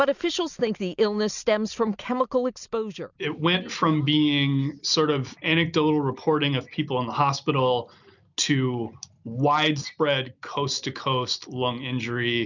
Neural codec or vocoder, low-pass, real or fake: none; 7.2 kHz; real